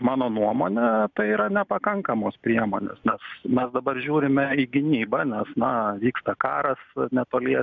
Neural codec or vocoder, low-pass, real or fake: none; 7.2 kHz; real